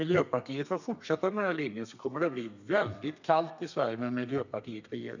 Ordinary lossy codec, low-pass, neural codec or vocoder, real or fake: none; 7.2 kHz; codec, 32 kHz, 1.9 kbps, SNAC; fake